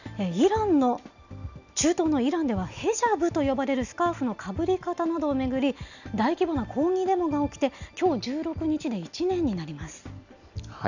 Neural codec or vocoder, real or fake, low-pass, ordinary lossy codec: none; real; 7.2 kHz; none